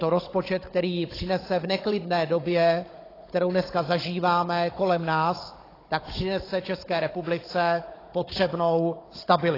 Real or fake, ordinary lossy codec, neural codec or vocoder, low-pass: fake; AAC, 24 kbps; codec, 16 kHz, 16 kbps, FunCodec, trained on Chinese and English, 50 frames a second; 5.4 kHz